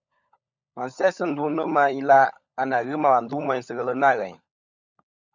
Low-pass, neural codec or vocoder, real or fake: 7.2 kHz; codec, 16 kHz, 16 kbps, FunCodec, trained on LibriTTS, 50 frames a second; fake